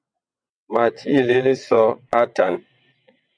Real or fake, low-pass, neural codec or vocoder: fake; 9.9 kHz; vocoder, 22.05 kHz, 80 mel bands, WaveNeXt